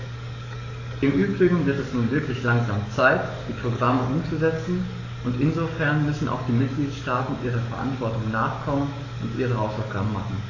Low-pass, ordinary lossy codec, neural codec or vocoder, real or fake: 7.2 kHz; AAC, 48 kbps; codec, 44.1 kHz, 7.8 kbps, DAC; fake